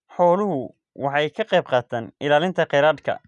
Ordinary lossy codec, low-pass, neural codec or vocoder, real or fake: none; 10.8 kHz; none; real